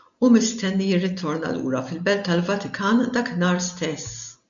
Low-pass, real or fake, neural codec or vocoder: 7.2 kHz; real; none